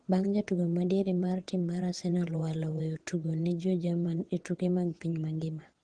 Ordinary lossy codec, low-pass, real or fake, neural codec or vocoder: Opus, 16 kbps; 9.9 kHz; fake; vocoder, 22.05 kHz, 80 mel bands, WaveNeXt